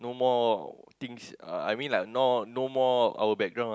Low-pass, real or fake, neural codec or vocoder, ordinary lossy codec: none; real; none; none